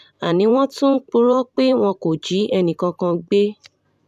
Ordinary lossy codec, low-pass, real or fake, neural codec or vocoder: none; 14.4 kHz; fake; vocoder, 44.1 kHz, 128 mel bands every 512 samples, BigVGAN v2